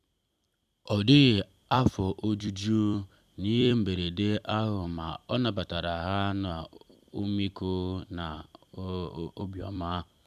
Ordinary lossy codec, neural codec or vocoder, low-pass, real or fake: none; vocoder, 44.1 kHz, 128 mel bands every 256 samples, BigVGAN v2; 14.4 kHz; fake